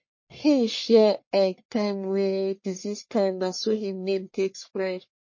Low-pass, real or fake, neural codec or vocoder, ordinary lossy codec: 7.2 kHz; fake; codec, 44.1 kHz, 1.7 kbps, Pupu-Codec; MP3, 32 kbps